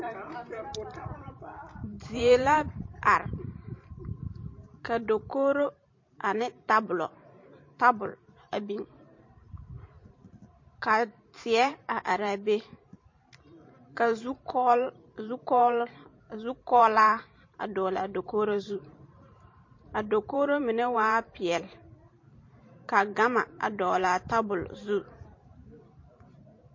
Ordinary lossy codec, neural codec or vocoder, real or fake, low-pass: MP3, 32 kbps; vocoder, 44.1 kHz, 128 mel bands every 512 samples, BigVGAN v2; fake; 7.2 kHz